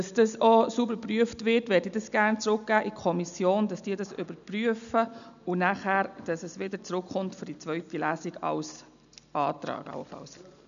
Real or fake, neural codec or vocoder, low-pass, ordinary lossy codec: real; none; 7.2 kHz; none